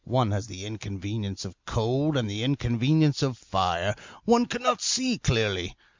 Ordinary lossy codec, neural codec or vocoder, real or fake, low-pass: MP3, 64 kbps; none; real; 7.2 kHz